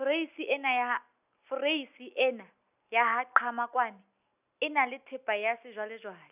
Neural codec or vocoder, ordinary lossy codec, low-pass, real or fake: none; none; 3.6 kHz; real